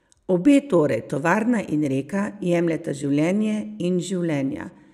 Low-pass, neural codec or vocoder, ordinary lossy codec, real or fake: 14.4 kHz; none; none; real